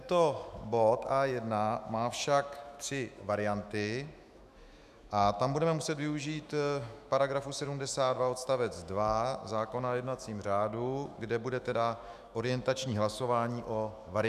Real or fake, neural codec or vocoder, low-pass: fake; autoencoder, 48 kHz, 128 numbers a frame, DAC-VAE, trained on Japanese speech; 14.4 kHz